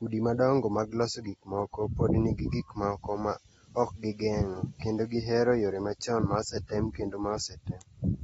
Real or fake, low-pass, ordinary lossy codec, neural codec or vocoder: real; 19.8 kHz; AAC, 24 kbps; none